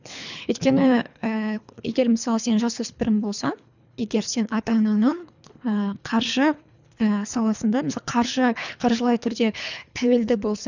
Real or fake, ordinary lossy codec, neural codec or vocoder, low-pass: fake; none; codec, 24 kHz, 3 kbps, HILCodec; 7.2 kHz